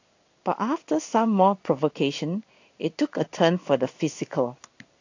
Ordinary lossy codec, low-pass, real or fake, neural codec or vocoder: AAC, 48 kbps; 7.2 kHz; fake; codec, 16 kHz in and 24 kHz out, 1 kbps, XY-Tokenizer